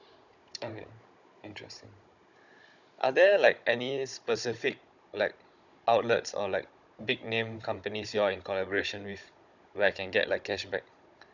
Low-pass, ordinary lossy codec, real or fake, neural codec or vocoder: 7.2 kHz; none; fake; codec, 16 kHz, 16 kbps, FunCodec, trained on Chinese and English, 50 frames a second